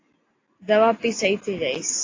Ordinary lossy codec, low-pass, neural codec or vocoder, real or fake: AAC, 32 kbps; 7.2 kHz; none; real